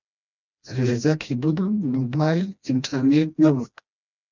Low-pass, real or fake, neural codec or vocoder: 7.2 kHz; fake; codec, 16 kHz, 1 kbps, FreqCodec, smaller model